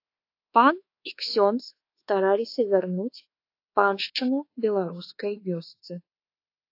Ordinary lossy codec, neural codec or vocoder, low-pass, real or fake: AAC, 32 kbps; codec, 24 kHz, 1.2 kbps, DualCodec; 5.4 kHz; fake